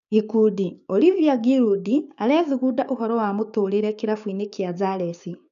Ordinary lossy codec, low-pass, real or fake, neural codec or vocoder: none; 7.2 kHz; fake; codec, 16 kHz, 6 kbps, DAC